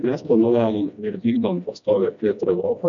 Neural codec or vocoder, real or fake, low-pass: codec, 16 kHz, 1 kbps, FreqCodec, smaller model; fake; 7.2 kHz